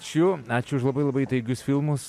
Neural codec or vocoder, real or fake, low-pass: none; real; 14.4 kHz